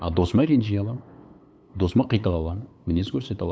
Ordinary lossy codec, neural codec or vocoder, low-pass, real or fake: none; codec, 16 kHz, 8 kbps, FunCodec, trained on LibriTTS, 25 frames a second; none; fake